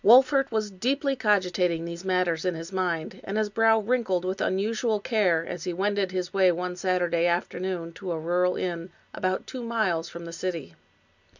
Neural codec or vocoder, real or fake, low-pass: none; real; 7.2 kHz